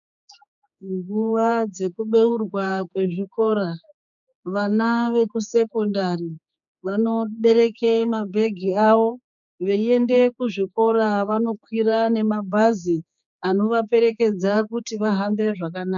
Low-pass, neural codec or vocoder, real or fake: 7.2 kHz; codec, 16 kHz, 4 kbps, X-Codec, HuBERT features, trained on general audio; fake